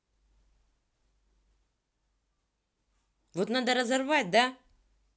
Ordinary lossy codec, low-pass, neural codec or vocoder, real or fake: none; none; none; real